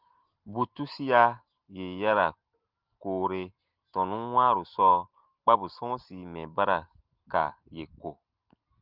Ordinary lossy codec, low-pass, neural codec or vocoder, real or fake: Opus, 32 kbps; 5.4 kHz; none; real